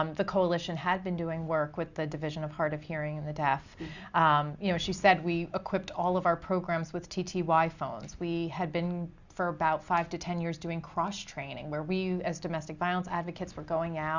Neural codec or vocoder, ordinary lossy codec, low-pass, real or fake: none; Opus, 64 kbps; 7.2 kHz; real